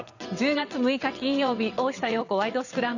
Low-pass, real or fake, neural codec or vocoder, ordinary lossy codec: 7.2 kHz; fake; vocoder, 22.05 kHz, 80 mel bands, WaveNeXt; none